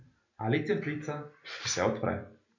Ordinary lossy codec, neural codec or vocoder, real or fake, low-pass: none; none; real; 7.2 kHz